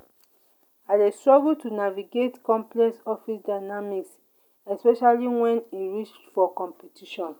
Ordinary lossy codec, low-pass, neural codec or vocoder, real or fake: none; 19.8 kHz; none; real